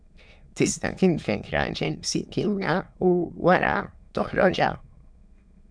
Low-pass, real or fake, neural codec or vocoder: 9.9 kHz; fake; autoencoder, 22.05 kHz, a latent of 192 numbers a frame, VITS, trained on many speakers